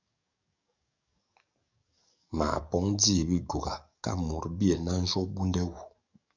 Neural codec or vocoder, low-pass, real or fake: codec, 44.1 kHz, 7.8 kbps, DAC; 7.2 kHz; fake